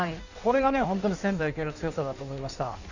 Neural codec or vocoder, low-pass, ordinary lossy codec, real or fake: codec, 16 kHz in and 24 kHz out, 1.1 kbps, FireRedTTS-2 codec; 7.2 kHz; none; fake